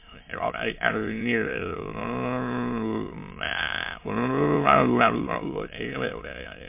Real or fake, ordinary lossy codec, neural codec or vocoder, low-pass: fake; MP3, 24 kbps; autoencoder, 22.05 kHz, a latent of 192 numbers a frame, VITS, trained on many speakers; 3.6 kHz